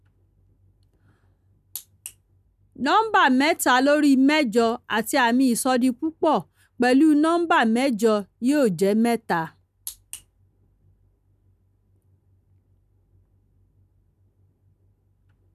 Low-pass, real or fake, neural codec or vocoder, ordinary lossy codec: 14.4 kHz; real; none; none